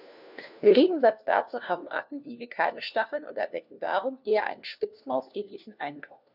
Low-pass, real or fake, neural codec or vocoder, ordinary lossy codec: 5.4 kHz; fake; codec, 16 kHz, 1 kbps, FunCodec, trained on LibriTTS, 50 frames a second; none